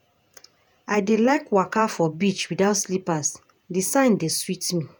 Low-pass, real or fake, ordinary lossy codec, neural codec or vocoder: none; fake; none; vocoder, 48 kHz, 128 mel bands, Vocos